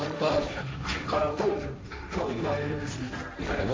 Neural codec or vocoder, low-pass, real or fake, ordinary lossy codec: codec, 16 kHz, 1.1 kbps, Voila-Tokenizer; none; fake; none